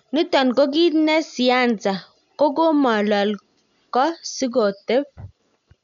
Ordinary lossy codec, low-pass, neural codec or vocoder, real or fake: none; 7.2 kHz; none; real